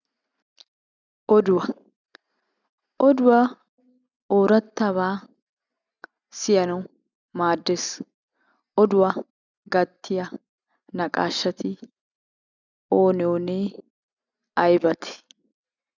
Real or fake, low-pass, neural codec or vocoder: real; 7.2 kHz; none